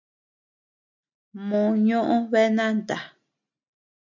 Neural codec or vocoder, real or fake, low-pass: none; real; 7.2 kHz